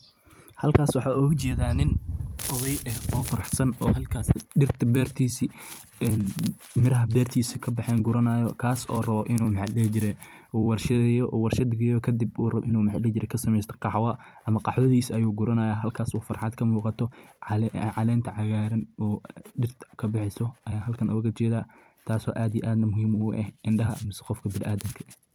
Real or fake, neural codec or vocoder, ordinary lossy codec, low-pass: fake; vocoder, 44.1 kHz, 128 mel bands every 256 samples, BigVGAN v2; none; none